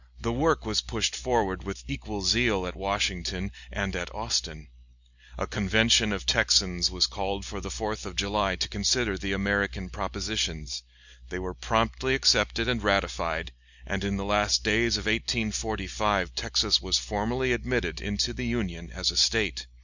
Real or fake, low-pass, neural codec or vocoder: real; 7.2 kHz; none